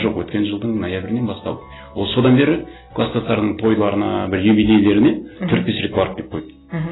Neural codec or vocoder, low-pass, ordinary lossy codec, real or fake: none; 7.2 kHz; AAC, 16 kbps; real